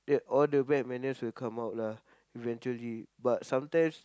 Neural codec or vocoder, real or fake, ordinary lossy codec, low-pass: none; real; none; none